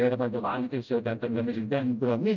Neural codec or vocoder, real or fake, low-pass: codec, 16 kHz, 0.5 kbps, FreqCodec, smaller model; fake; 7.2 kHz